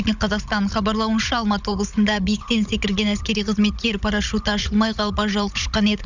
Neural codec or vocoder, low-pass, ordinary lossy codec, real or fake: codec, 16 kHz, 16 kbps, FunCodec, trained on Chinese and English, 50 frames a second; 7.2 kHz; none; fake